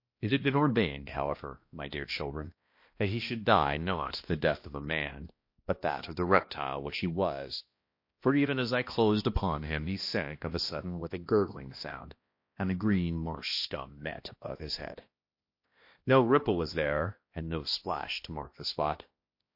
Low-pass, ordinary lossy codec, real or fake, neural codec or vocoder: 5.4 kHz; MP3, 32 kbps; fake; codec, 16 kHz, 1 kbps, X-Codec, HuBERT features, trained on balanced general audio